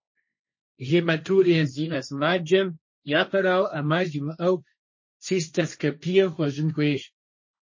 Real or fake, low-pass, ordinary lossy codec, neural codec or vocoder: fake; 7.2 kHz; MP3, 32 kbps; codec, 16 kHz, 1.1 kbps, Voila-Tokenizer